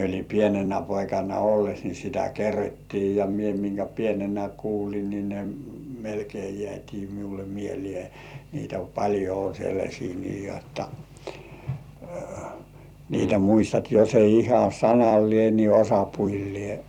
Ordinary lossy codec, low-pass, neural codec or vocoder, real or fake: none; 19.8 kHz; vocoder, 44.1 kHz, 128 mel bands every 256 samples, BigVGAN v2; fake